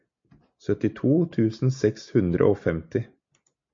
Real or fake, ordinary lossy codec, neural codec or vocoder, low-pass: real; MP3, 48 kbps; none; 7.2 kHz